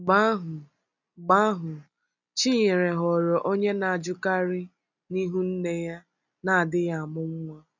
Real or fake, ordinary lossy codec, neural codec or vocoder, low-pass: real; none; none; 7.2 kHz